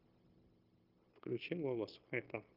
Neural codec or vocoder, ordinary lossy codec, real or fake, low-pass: codec, 16 kHz, 0.9 kbps, LongCat-Audio-Codec; Opus, 24 kbps; fake; 5.4 kHz